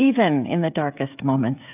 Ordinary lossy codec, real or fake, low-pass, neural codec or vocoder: AAC, 32 kbps; fake; 3.6 kHz; codec, 16 kHz, 16 kbps, FreqCodec, smaller model